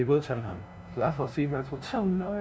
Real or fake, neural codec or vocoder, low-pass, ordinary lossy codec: fake; codec, 16 kHz, 0.5 kbps, FunCodec, trained on LibriTTS, 25 frames a second; none; none